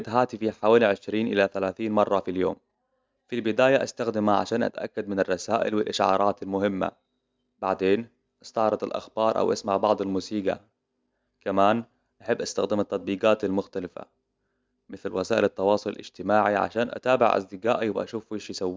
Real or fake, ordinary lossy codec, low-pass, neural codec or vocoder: real; none; none; none